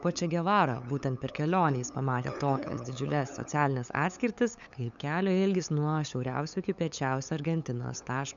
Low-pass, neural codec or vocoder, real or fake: 7.2 kHz; codec, 16 kHz, 8 kbps, FunCodec, trained on LibriTTS, 25 frames a second; fake